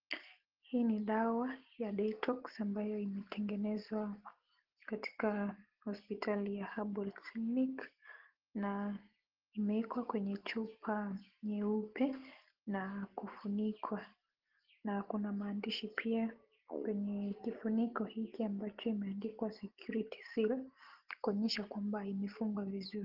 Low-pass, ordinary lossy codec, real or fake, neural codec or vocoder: 5.4 kHz; Opus, 16 kbps; real; none